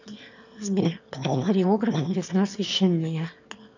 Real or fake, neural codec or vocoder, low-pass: fake; autoencoder, 22.05 kHz, a latent of 192 numbers a frame, VITS, trained on one speaker; 7.2 kHz